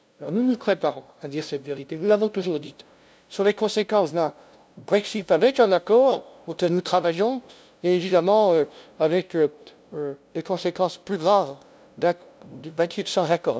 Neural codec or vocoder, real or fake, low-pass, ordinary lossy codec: codec, 16 kHz, 0.5 kbps, FunCodec, trained on LibriTTS, 25 frames a second; fake; none; none